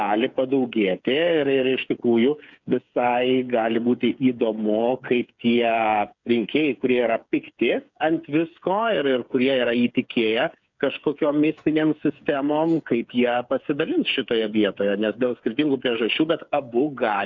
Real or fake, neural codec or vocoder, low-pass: fake; codec, 16 kHz, 8 kbps, FreqCodec, smaller model; 7.2 kHz